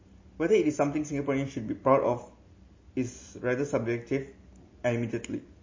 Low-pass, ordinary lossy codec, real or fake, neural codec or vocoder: 7.2 kHz; MP3, 32 kbps; real; none